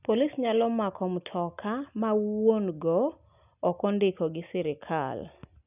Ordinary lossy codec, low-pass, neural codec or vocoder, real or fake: none; 3.6 kHz; none; real